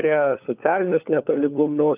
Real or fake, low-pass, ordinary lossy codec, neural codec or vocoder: fake; 3.6 kHz; Opus, 24 kbps; codec, 16 kHz, 4 kbps, FunCodec, trained on LibriTTS, 50 frames a second